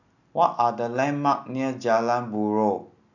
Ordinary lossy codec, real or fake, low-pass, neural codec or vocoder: none; real; 7.2 kHz; none